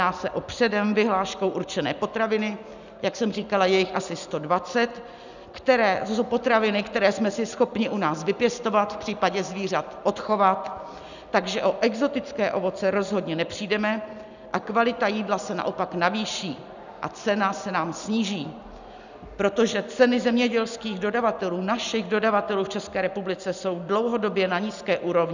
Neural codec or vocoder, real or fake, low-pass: none; real; 7.2 kHz